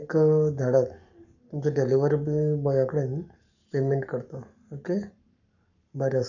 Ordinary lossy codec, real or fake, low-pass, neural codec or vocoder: none; fake; 7.2 kHz; codec, 44.1 kHz, 7.8 kbps, Pupu-Codec